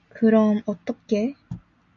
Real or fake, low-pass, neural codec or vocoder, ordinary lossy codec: real; 7.2 kHz; none; MP3, 96 kbps